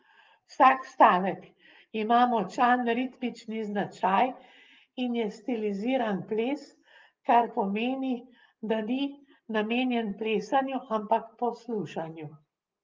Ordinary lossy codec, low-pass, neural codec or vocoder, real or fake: Opus, 32 kbps; 7.2 kHz; none; real